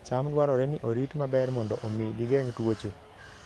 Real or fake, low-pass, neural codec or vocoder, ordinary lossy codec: fake; 19.8 kHz; codec, 44.1 kHz, 7.8 kbps, DAC; Opus, 32 kbps